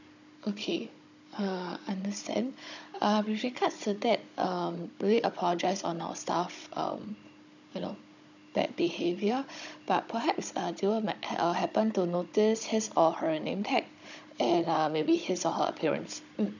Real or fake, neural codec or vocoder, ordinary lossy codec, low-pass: fake; codec, 16 kHz, 16 kbps, FunCodec, trained on Chinese and English, 50 frames a second; none; 7.2 kHz